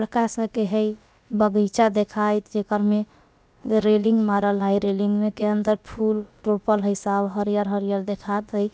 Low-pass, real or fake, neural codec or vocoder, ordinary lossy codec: none; fake; codec, 16 kHz, about 1 kbps, DyCAST, with the encoder's durations; none